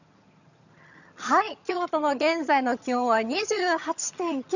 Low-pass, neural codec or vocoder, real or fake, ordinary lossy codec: 7.2 kHz; vocoder, 22.05 kHz, 80 mel bands, HiFi-GAN; fake; none